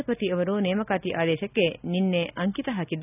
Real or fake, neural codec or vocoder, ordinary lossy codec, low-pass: real; none; none; 3.6 kHz